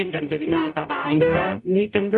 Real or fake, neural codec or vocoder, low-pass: fake; codec, 44.1 kHz, 0.9 kbps, DAC; 10.8 kHz